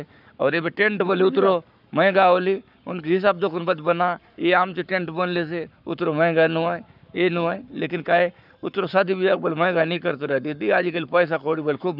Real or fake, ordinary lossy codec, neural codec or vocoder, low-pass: fake; none; codec, 44.1 kHz, 7.8 kbps, Pupu-Codec; 5.4 kHz